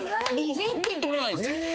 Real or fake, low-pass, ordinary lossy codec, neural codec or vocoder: fake; none; none; codec, 16 kHz, 4 kbps, X-Codec, HuBERT features, trained on balanced general audio